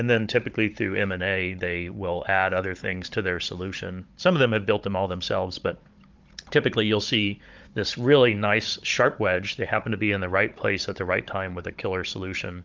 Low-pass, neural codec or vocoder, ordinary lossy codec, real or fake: 7.2 kHz; codec, 16 kHz, 16 kbps, FunCodec, trained on Chinese and English, 50 frames a second; Opus, 24 kbps; fake